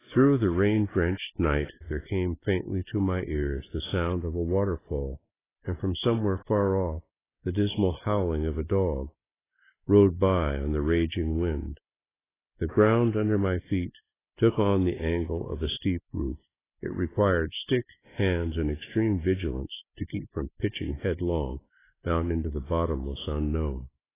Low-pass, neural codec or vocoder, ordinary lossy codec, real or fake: 3.6 kHz; none; AAC, 16 kbps; real